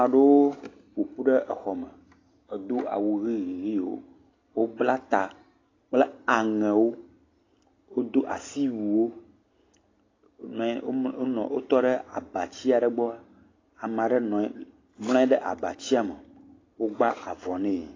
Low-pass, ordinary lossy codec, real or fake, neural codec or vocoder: 7.2 kHz; AAC, 32 kbps; real; none